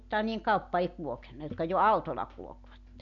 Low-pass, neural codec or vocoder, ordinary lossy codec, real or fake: 7.2 kHz; none; none; real